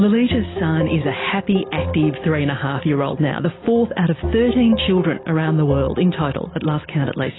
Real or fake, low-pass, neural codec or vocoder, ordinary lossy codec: real; 7.2 kHz; none; AAC, 16 kbps